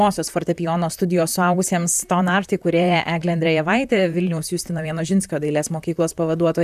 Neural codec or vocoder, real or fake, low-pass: vocoder, 44.1 kHz, 128 mel bands, Pupu-Vocoder; fake; 14.4 kHz